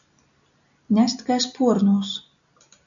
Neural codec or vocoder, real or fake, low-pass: none; real; 7.2 kHz